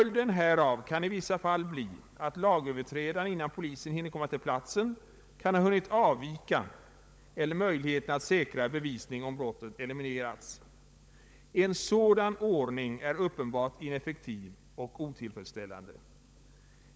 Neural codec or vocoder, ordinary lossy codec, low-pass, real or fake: codec, 16 kHz, 16 kbps, FunCodec, trained on LibriTTS, 50 frames a second; none; none; fake